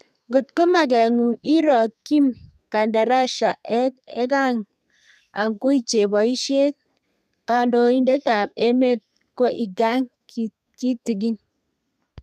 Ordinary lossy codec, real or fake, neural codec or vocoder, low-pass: none; fake; codec, 32 kHz, 1.9 kbps, SNAC; 14.4 kHz